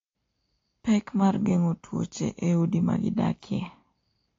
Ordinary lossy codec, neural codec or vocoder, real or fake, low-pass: AAC, 32 kbps; none; real; 7.2 kHz